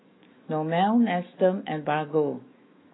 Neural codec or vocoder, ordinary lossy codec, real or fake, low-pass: autoencoder, 48 kHz, 128 numbers a frame, DAC-VAE, trained on Japanese speech; AAC, 16 kbps; fake; 7.2 kHz